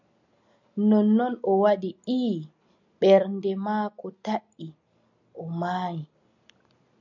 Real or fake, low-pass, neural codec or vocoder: real; 7.2 kHz; none